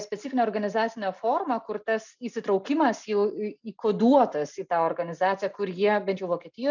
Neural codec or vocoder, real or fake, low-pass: none; real; 7.2 kHz